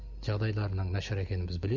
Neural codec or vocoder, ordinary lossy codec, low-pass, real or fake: none; none; 7.2 kHz; real